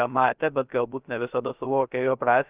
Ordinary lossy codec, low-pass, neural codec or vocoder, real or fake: Opus, 32 kbps; 3.6 kHz; codec, 16 kHz, 0.3 kbps, FocalCodec; fake